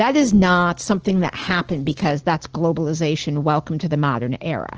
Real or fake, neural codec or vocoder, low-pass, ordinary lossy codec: real; none; 7.2 kHz; Opus, 16 kbps